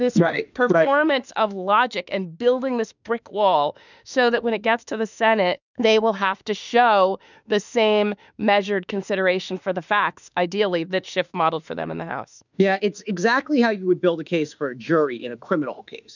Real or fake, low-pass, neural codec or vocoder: fake; 7.2 kHz; autoencoder, 48 kHz, 32 numbers a frame, DAC-VAE, trained on Japanese speech